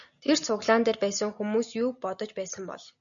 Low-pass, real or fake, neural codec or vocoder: 7.2 kHz; real; none